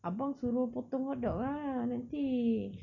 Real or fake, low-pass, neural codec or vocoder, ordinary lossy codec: real; 7.2 kHz; none; none